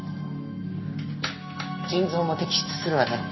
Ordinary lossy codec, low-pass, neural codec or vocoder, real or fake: MP3, 24 kbps; 7.2 kHz; none; real